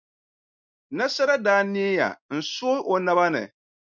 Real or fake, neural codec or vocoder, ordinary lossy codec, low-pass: real; none; MP3, 64 kbps; 7.2 kHz